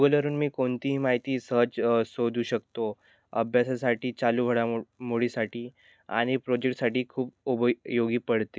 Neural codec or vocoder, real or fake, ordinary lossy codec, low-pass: none; real; none; none